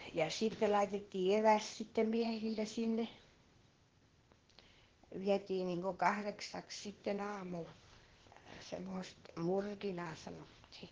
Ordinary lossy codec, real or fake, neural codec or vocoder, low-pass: Opus, 16 kbps; fake; codec, 16 kHz, 0.8 kbps, ZipCodec; 7.2 kHz